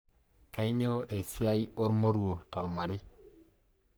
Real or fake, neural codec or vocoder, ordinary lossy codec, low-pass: fake; codec, 44.1 kHz, 3.4 kbps, Pupu-Codec; none; none